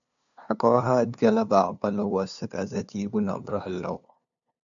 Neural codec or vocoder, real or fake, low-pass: codec, 16 kHz, 2 kbps, FunCodec, trained on LibriTTS, 25 frames a second; fake; 7.2 kHz